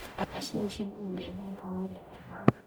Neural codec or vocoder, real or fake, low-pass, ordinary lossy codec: codec, 44.1 kHz, 0.9 kbps, DAC; fake; none; none